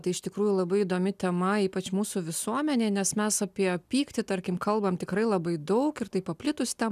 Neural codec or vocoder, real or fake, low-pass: none; real; 14.4 kHz